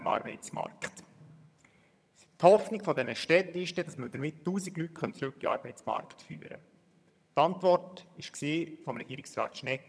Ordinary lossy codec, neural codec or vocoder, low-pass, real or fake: none; vocoder, 22.05 kHz, 80 mel bands, HiFi-GAN; none; fake